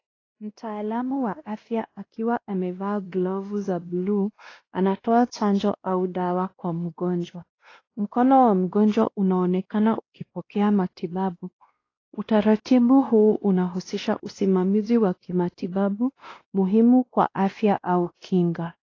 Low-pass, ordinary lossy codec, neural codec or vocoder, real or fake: 7.2 kHz; AAC, 32 kbps; codec, 16 kHz, 1 kbps, X-Codec, WavLM features, trained on Multilingual LibriSpeech; fake